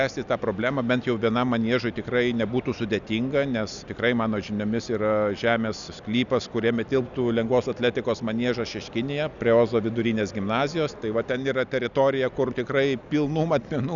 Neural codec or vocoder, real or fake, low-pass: none; real; 7.2 kHz